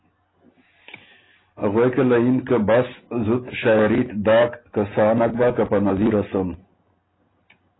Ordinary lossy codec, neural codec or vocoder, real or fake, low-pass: AAC, 16 kbps; none; real; 7.2 kHz